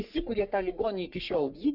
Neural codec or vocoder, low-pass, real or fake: codec, 44.1 kHz, 3.4 kbps, Pupu-Codec; 5.4 kHz; fake